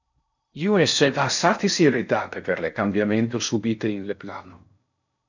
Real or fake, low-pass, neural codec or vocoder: fake; 7.2 kHz; codec, 16 kHz in and 24 kHz out, 0.6 kbps, FocalCodec, streaming, 4096 codes